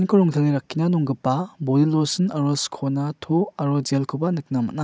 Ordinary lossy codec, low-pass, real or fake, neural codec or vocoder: none; none; real; none